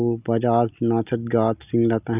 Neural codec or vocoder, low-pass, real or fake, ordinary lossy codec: none; 3.6 kHz; real; none